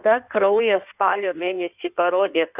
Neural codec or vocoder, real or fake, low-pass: codec, 16 kHz in and 24 kHz out, 1.1 kbps, FireRedTTS-2 codec; fake; 3.6 kHz